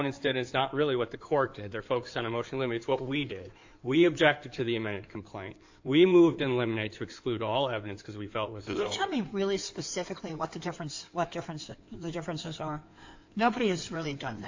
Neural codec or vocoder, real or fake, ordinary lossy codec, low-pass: codec, 16 kHz in and 24 kHz out, 2.2 kbps, FireRedTTS-2 codec; fake; AAC, 48 kbps; 7.2 kHz